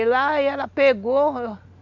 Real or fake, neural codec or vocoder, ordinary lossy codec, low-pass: real; none; Opus, 64 kbps; 7.2 kHz